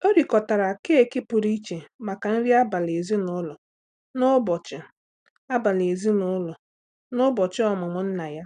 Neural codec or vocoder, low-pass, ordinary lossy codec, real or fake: none; 9.9 kHz; none; real